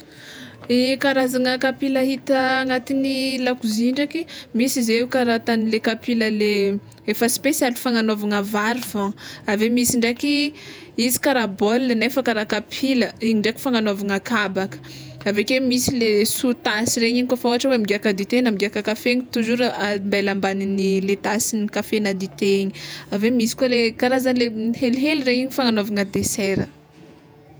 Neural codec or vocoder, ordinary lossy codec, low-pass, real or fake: vocoder, 48 kHz, 128 mel bands, Vocos; none; none; fake